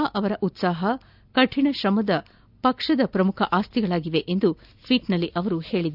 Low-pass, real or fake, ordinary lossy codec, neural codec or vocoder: 5.4 kHz; real; none; none